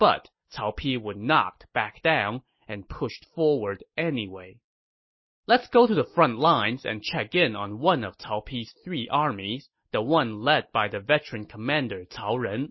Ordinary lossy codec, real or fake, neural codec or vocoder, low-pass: MP3, 24 kbps; real; none; 7.2 kHz